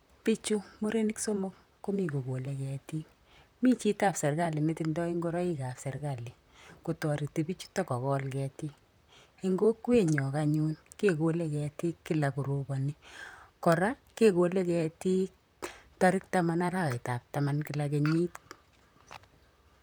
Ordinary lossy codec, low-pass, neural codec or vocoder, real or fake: none; none; vocoder, 44.1 kHz, 128 mel bands, Pupu-Vocoder; fake